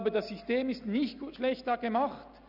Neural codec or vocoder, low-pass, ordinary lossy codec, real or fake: none; 5.4 kHz; none; real